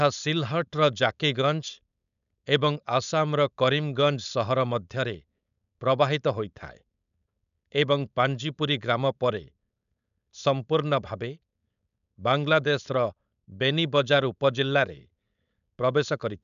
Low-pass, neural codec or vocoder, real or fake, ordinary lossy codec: 7.2 kHz; codec, 16 kHz, 4.8 kbps, FACodec; fake; none